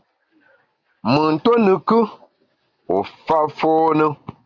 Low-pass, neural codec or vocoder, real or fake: 7.2 kHz; none; real